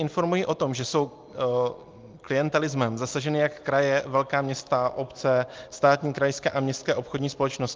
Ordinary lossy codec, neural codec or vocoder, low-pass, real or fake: Opus, 32 kbps; none; 7.2 kHz; real